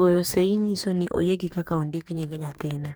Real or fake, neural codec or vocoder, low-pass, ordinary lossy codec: fake; codec, 44.1 kHz, 2.6 kbps, DAC; none; none